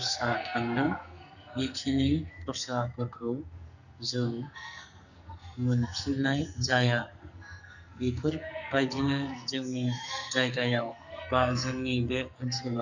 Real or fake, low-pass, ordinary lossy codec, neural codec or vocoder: fake; 7.2 kHz; none; codec, 44.1 kHz, 2.6 kbps, SNAC